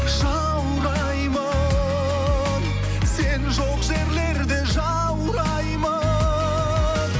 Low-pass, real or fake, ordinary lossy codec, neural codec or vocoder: none; real; none; none